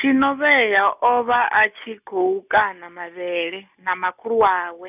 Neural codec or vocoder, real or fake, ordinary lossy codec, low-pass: none; real; none; 3.6 kHz